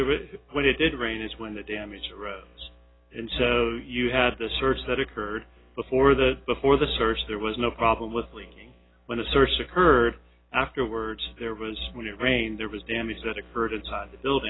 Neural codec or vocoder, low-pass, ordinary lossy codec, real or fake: none; 7.2 kHz; AAC, 16 kbps; real